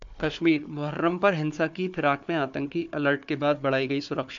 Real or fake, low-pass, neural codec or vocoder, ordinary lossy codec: fake; 7.2 kHz; codec, 16 kHz, 4 kbps, FunCodec, trained on Chinese and English, 50 frames a second; MP3, 64 kbps